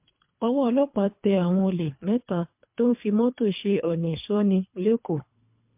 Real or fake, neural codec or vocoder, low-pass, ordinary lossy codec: fake; codec, 24 kHz, 3 kbps, HILCodec; 3.6 kHz; MP3, 32 kbps